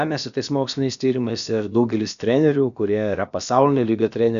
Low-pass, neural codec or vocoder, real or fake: 7.2 kHz; codec, 16 kHz, about 1 kbps, DyCAST, with the encoder's durations; fake